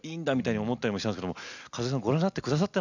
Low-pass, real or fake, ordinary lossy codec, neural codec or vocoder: 7.2 kHz; real; none; none